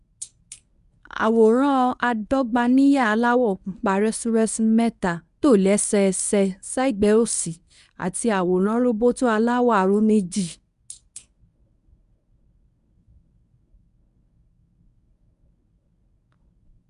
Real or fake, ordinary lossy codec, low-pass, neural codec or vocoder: fake; none; 10.8 kHz; codec, 24 kHz, 0.9 kbps, WavTokenizer, medium speech release version 1